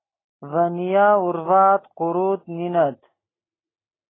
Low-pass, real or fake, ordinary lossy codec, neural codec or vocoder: 7.2 kHz; real; AAC, 16 kbps; none